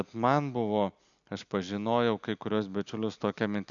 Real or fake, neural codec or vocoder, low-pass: real; none; 7.2 kHz